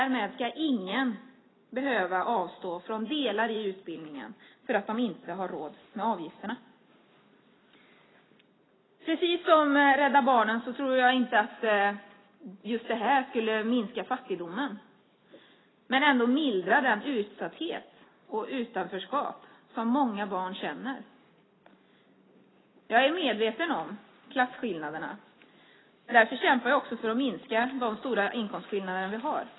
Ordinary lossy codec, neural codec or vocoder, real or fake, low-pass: AAC, 16 kbps; none; real; 7.2 kHz